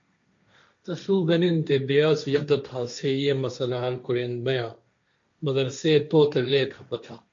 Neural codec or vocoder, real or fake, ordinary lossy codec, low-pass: codec, 16 kHz, 1.1 kbps, Voila-Tokenizer; fake; MP3, 48 kbps; 7.2 kHz